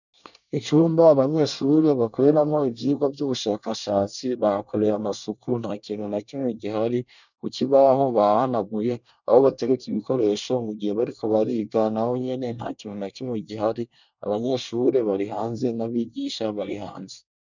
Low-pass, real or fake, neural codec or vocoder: 7.2 kHz; fake; codec, 24 kHz, 1 kbps, SNAC